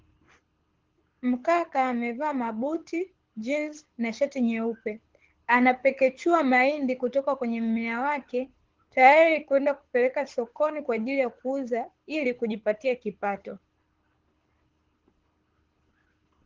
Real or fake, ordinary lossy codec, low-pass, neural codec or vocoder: fake; Opus, 16 kbps; 7.2 kHz; codec, 24 kHz, 6 kbps, HILCodec